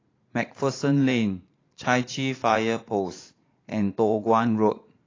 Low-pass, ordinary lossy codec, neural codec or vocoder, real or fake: 7.2 kHz; AAC, 32 kbps; vocoder, 44.1 kHz, 80 mel bands, Vocos; fake